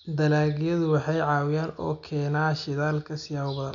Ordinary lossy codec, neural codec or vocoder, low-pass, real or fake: none; none; 7.2 kHz; real